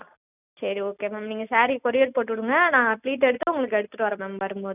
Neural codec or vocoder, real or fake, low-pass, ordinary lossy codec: none; real; 3.6 kHz; none